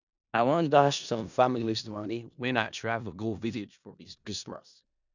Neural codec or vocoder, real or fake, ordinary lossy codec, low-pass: codec, 16 kHz in and 24 kHz out, 0.4 kbps, LongCat-Audio-Codec, four codebook decoder; fake; none; 7.2 kHz